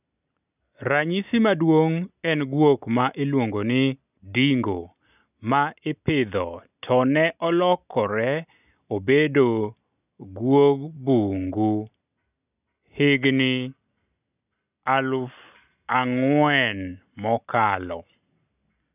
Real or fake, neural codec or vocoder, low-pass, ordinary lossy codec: real; none; 3.6 kHz; none